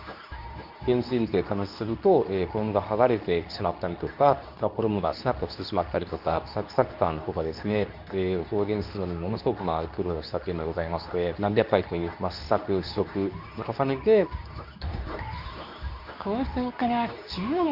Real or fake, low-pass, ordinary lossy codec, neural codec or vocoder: fake; 5.4 kHz; none; codec, 24 kHz, 0.9 kbps, WavTokenizer, medium speech release version 2